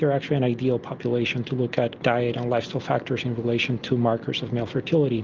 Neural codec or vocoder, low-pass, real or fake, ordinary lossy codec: none; 7.2 kHz; real; Opus, 32 kbps